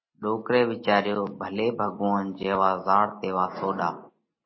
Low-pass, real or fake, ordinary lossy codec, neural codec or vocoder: 7.2 kHz; real; MP3, 24 kbps; none